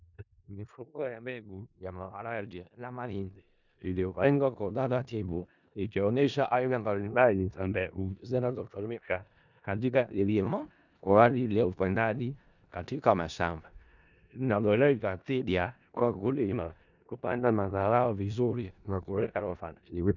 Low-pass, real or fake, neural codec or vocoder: 7.2 kHz; fake; codec, 16 kHz in and 24 kHz out, 0.4 kbps, LongCat-Audio-Codec, four codebook decoder